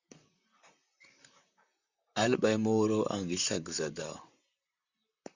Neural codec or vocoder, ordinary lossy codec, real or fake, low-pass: vocoder, 44.1 kHz, 128 mel bands, Pupu-Vocoder; Opus, 64 kbps; fake; 7.2 kHz